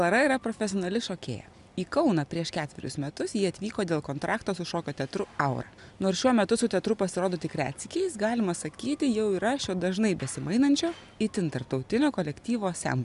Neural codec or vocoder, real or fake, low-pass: none; real; 10.8 kHz